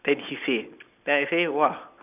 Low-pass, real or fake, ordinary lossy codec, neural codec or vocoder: 3.6 kHz; real; none; none